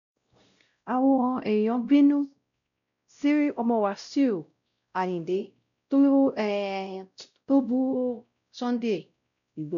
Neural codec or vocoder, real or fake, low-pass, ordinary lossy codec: codec, 16 kHz, 0.5 kbps, X-Codec, WavLM features, trained on Multilingual LibriSpeech; fake; 7.2 kHz; none